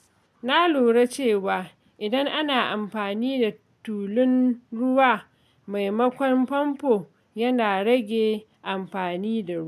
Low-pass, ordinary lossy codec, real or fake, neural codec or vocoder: 14.4 kHz; MP3, 96 kbps; real; none